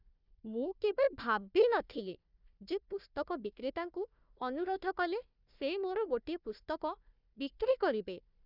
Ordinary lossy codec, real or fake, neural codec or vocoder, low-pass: none; fake; codec, 16 kHz, 1 kbps, FunCodec, trained on Chinese and English, 50 frames a second; 5.4 kHz